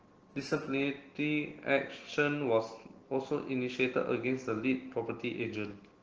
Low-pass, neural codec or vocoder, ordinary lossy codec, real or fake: 7.2 kHz; none; Opus, 16 kbps; real